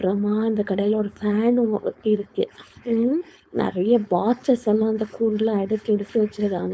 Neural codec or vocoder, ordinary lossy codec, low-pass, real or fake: codec, 16 kHz, 4.8 kbps, FACodec; none; none; fake